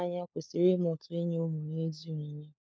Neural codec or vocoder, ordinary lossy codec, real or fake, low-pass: codec, 16 kHz, 4 kbps, FunCodec, trained on LibriTTS, 50 frames a second; none; fake; none